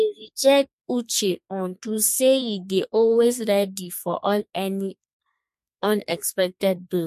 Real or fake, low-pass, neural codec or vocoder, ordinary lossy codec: fake; 14.4 kHz; codec, 32 kHz, 1.9 kbps, SNAC; MP3, 64 kbps